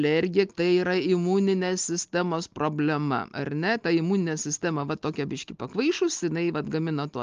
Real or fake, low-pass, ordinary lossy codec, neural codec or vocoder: real; 7.2 kHz; Opus, 32 kbps; none